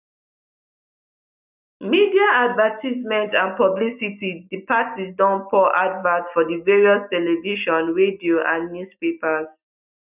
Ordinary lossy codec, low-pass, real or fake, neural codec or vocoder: none; 3.6 kHz; real; none